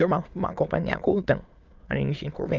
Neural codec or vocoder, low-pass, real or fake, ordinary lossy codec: autoencoder, 22.05 kHz, a latent of 192 numbers a frame, VITS, trained on many speakers; 7.2 kHz; fake; Opus, 32 kbps